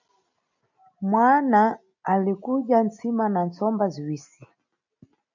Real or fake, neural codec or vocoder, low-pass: real; none; 7.2 kHz